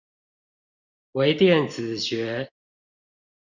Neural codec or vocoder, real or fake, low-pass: none; real; 7.2 kHz